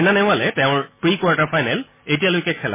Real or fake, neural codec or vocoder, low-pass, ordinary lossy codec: real; none; 3.6 kHz; MP3, 16 kbps